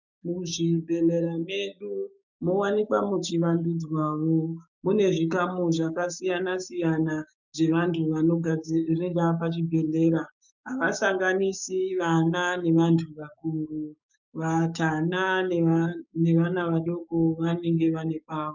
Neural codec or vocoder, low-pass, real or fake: codec, 16 kHz, 6 kbps, DAC; 7.2 kHz; fake